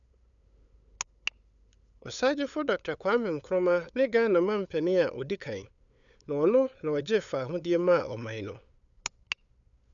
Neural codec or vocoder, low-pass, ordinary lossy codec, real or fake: codec, 16 kHz, 8 kbps, FunCodec, trained on LibriTTS, 25 frames a second; 7.2 kHz; none; fake